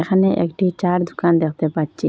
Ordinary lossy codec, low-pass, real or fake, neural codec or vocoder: none; none; real; none